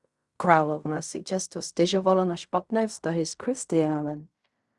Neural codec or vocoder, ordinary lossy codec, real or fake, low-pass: codec, 16 kHz in and 24 kHz out, 0.4 kbps, LongCat-Audio-Codec, fine tuned four codebook decoder; Opus, 64 kbps; fake; 10.8 kHz